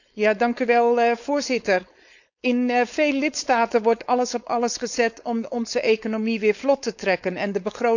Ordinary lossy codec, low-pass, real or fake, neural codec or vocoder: none; 7.2 kHz; fake; codec, 16 kHz, 4.8 kbps, FACodec